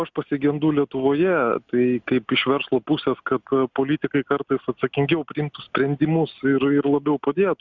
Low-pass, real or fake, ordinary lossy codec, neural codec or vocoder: 7.2 kHz; real; Opus, 64 kbps; none